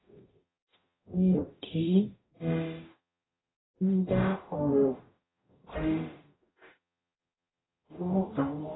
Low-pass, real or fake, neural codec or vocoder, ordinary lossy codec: 7.2 kHz; fake; codec, 44.1 kHz, 0.9 kbps, DAC; AAC, 16 kbps